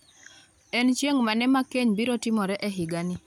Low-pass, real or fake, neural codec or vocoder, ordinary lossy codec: 19.8 kHz; real; none; none